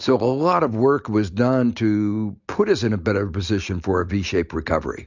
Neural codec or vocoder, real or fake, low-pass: none; real; 7.2 kHz